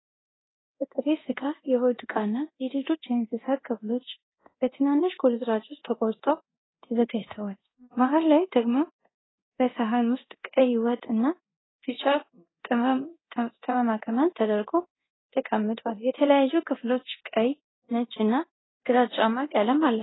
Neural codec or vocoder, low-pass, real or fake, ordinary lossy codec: codec, 24 kHz, 0.9 kbps, DualCodec; 7.2 kHz; fake; AAC, 16 kbps